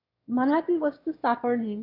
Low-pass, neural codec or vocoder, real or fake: 5.4 kHz; autoencoder, 22.05 kHz, a latent of 192 numbers a frame, VITS, trained on one speaker; fake